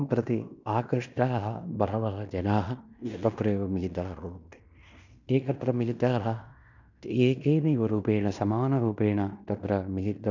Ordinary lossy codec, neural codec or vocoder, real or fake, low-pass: none; codec, 16 kHz in and 24 kHz out, 0.9 kbps, LongCat-Audio-Codec, fine tuned four codebook decoder; fake; 7.2 kHz